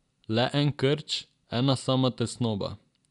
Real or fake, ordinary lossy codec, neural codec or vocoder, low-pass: real; none; none; 10.8 kHz